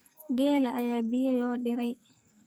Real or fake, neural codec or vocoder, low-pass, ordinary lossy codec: fake; codec, 44.1 kHz, 2.6 kbps, SNAC; none; none